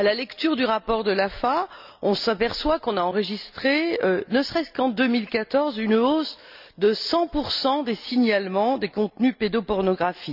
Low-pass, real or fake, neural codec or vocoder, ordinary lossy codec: 5.4 kHz; real; none; none